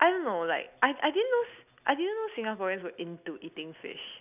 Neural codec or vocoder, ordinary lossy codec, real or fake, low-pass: none; none; real; 3.6 kHz